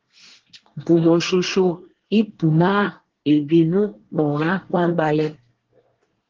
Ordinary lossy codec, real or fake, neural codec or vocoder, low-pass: Opus, 16 kbps; fake; codec, 24 kHz, 1 kbps, SNAC; 7.2 kHz